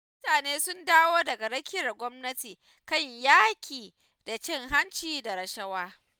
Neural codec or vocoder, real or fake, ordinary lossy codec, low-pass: none; real; none; none